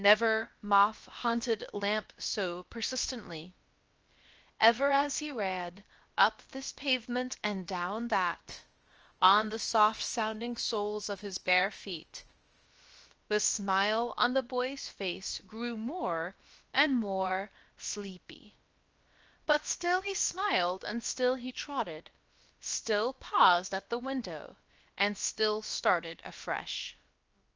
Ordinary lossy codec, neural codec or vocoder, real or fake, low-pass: Opus, 24 kbps; codec, 16 kHz, about 1 kbps, DyCAST, with the encoder's durations; fake; 7.2 kHz